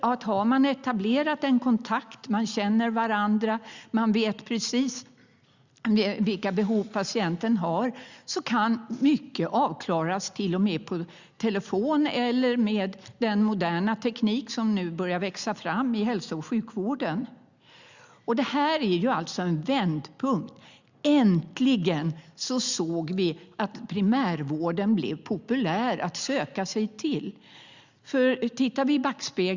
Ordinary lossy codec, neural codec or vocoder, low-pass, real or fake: Opus, 64 kbps; none; 7.2 kHz; real